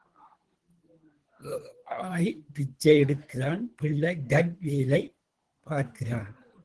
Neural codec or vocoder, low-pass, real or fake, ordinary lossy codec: codec, 24 kHz, 3 kbps, HILCodec; 10.8 kHz; fake; Opus, 16 kbps